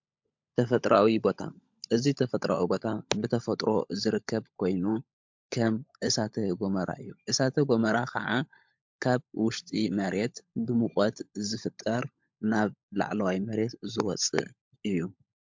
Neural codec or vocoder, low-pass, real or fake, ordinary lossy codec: codec, 16 kHz, 16 kbps, FunCodec, trained on LibriTTS, 50 frames a second; 7.2 kHz; fake; MP3, 64 kbps